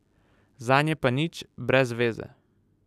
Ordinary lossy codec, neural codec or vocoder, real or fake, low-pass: none; none; real; 14.4 kHz